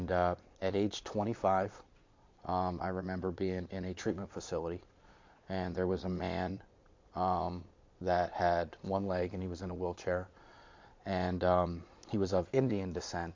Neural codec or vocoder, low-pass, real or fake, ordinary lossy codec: vocoder, 22.05 kHz, 80 mel bands, Vocos; 7.2 kHz; fake; MP3, 48 kbps